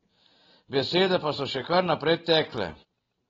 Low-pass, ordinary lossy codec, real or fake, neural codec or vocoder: 7.2 kHz; AAC, 24 kbps; real; none